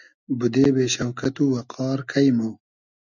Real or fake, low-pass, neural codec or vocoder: real; 7.2 kHz; none